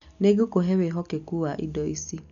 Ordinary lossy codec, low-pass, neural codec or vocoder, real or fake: none; 7.2 kHz; none; real